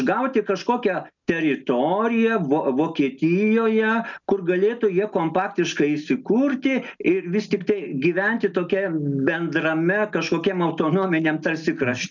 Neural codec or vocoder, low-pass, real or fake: none; 7.2 kHz; real